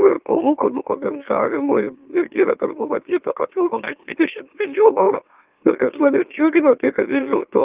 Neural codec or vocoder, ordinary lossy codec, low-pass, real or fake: autoencoder, 44.1 kHz, a latent of 192 numbers a frame, MeloTTS; Opus, 32 kbps; 3.6 kHz; fake